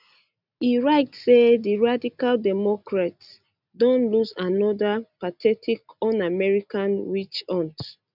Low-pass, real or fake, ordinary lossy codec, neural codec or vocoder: 5.4 kHz; real; none; none